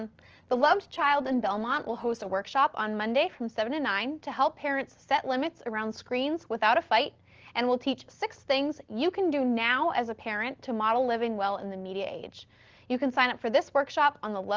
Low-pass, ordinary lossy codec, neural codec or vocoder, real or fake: 7.2 kHz; Opus, 16 kbps; none; real